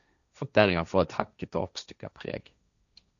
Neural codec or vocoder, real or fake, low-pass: codec, 16 kHz, 1.1 kbps, Voila-Tokenizer; fake; 7.2 kHz